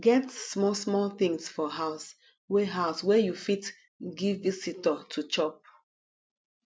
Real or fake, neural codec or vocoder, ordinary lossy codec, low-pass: real; none; none; none